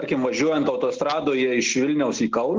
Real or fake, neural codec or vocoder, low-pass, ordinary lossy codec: real; none; 7.2 kHz; Opus, 16 kbps